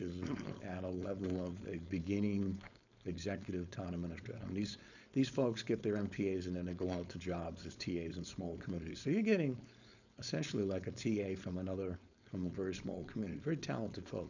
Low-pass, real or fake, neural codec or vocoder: 7.2 kHz; fake; codec, 16 kHz, 4.8 kbps, FACodec